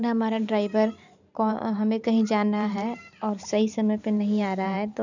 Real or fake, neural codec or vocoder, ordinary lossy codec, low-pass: fake; vocoder, 44.1 kHz, 128 mel bands every 512 samples, BigVGAN v2; none; 7.2 kHz